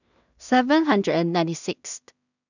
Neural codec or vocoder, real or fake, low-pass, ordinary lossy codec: codec, 16 kHz in and 24 kHz out, 0.4 kbps, LongCat-Audio-Codec, two codebook decoder; fake; 7.2 kHz; none